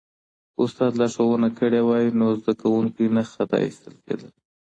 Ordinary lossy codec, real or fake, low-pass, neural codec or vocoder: AAC, 32 kbps; real; 9.9 kHz; none